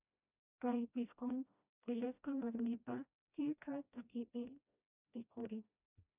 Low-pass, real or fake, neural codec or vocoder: 3.6 kHz; fake; codec, 16 kHz, 1 kbps, FreqCodec, smaller model